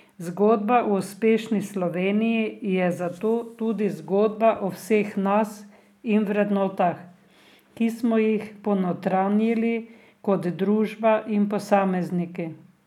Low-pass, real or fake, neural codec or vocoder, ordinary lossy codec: 19.8 kHz; real; none; none